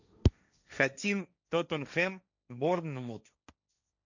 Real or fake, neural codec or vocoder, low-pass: fake; codec, 16 kHz, 1.1 kbps, Voila-Tokenizer; 7.2 kHz